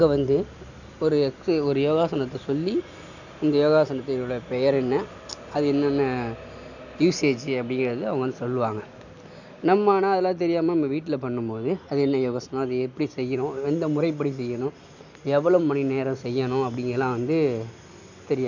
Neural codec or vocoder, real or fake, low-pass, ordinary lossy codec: none; real; 7.2 kHz; none